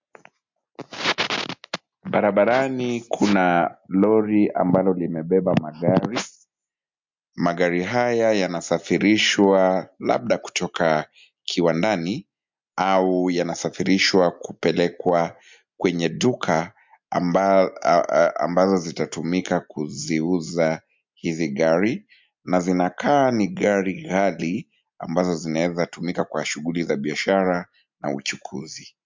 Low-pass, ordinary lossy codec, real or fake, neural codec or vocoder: 7.2 kHz; MP3, 64 kbps; real; none